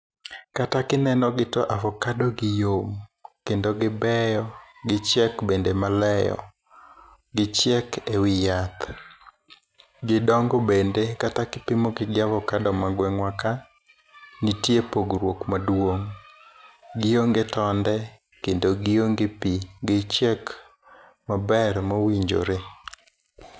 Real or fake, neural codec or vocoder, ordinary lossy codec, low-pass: real; none; none; none